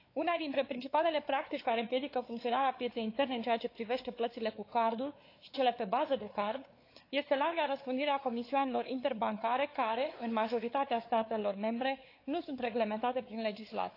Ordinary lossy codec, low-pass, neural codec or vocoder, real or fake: AAC, 32 kbps; 5.4 kHz; codec, 16 kHz, 4 kbps, X-Codec, WavLM features, trained on Multilingual LibriSpeech; fake